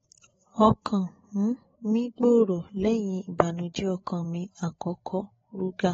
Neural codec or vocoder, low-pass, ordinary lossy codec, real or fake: codec, 16 kHz, 8 kbps, FreqCodec, larger model; 7.2 kHz; AAC, 24 kbps; fake